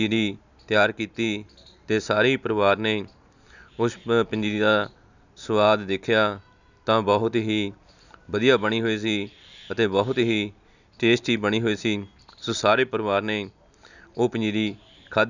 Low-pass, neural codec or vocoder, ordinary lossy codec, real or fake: 7.2 kHz; none; none; real